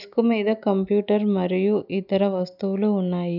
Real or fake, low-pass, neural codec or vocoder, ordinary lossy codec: real; 5.4 kHz; none; none